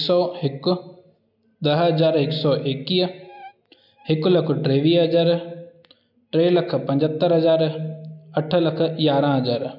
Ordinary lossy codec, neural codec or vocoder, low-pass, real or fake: none; none; 5.4 kHz; real